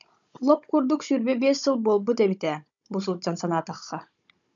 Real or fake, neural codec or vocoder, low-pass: fake; codec, 16 kHz, 16 kbps, FunCodec, trained on Chinese and English, 50 frames a second; 7.2 kHz